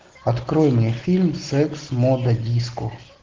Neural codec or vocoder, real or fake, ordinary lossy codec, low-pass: none; real; Opus, 16 kbps; 7.2 kHz